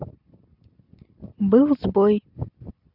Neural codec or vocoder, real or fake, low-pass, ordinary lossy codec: none; real; 5.4 kHz; none